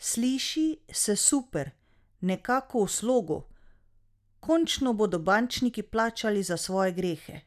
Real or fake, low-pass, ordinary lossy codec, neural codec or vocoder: real; 14.4 kHz; none; none